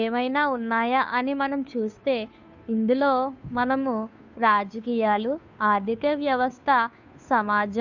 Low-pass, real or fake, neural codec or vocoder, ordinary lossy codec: 7.2 kHz; fake; codec, 16 kHz, 2 kbps, FunCodec, trained on Chinese and English, 25 frames a second; none